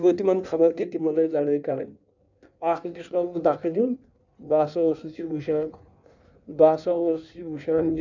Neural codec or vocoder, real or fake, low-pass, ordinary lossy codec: codec, 16 kHz in and 24 kHz out, 1.1 kbps, FireRedTTS-2 codec; fake; 7.2 kHz; none